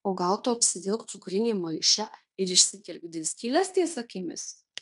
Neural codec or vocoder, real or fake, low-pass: codec, 16 kHz in and 24 kHz out, 0.9 kbps, LongCat-Audio-Codec, fine tuned four codebook decoder; fake; 10.8 kHz